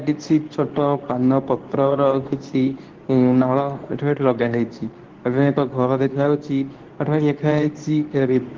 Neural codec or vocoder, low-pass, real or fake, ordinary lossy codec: codec, 24 kHz, 0.9 kbps, WavTokenizer, medium speech release version 2; 7.2 kHz; fake; Opus, 16 kbps